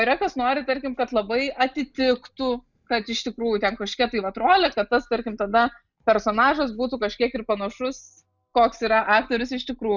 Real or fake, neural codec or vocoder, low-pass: real; none; 7.2 kHz